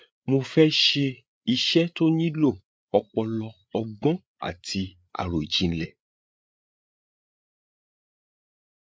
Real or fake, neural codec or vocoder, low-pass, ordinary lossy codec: fake; codec, 16 kHz, 8 kbps, FreqCodec, larger model; none; none